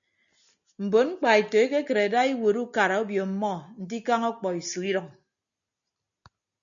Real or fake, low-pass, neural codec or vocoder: real; 7.2 kHz; none